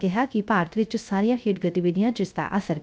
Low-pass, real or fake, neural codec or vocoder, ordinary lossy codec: none; fake; codec, 16 kHz, 0.3 kbps, FocalCodec; none